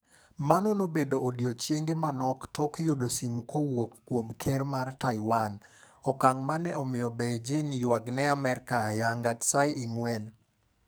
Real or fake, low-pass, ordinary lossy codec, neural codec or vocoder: fake; none; none; codec, 44.1 kHz, 2.6 kbps, SNAC